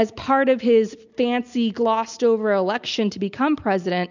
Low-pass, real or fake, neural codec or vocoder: 7.2 kHz; real; none